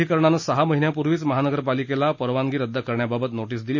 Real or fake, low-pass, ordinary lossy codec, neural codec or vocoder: real; 7.2 kHz; MP3, 32 kbps; none